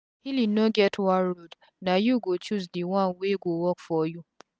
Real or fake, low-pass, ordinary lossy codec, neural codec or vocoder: real; none; none; none